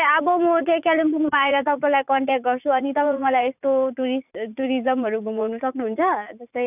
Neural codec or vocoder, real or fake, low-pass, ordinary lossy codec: vocoder, 44.1 kHz, 80 mel bands, Vocos; fake; 3.6 kHz; none